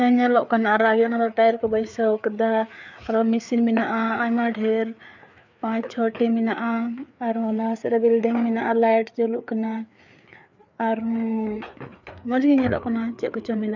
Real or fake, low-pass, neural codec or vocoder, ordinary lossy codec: fake; 7.2 kHz; codec, 16 kHz, 4 kbps, FreqCodec, larger model; none